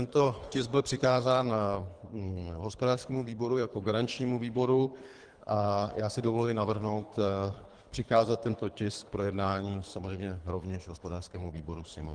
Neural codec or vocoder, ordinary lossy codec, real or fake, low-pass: codec, 24 kHz, 3 kbps, HILCodec; Opus, 24 kbps; fake; 9.9 kHz